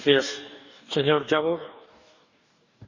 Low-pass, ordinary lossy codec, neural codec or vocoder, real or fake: 7.2 kHz; none; codec, 44.1 kHz, 2.6 kbps, DAC; fake